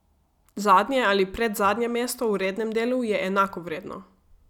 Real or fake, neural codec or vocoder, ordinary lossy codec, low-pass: real; none; none; 19.8 kHz